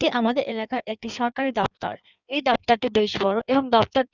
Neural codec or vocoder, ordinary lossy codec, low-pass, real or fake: codec, 16 kHz in and 24 kHz out, 1.1 kbps, FireRedTTS-2 codec; none; 7.2 kHz; fake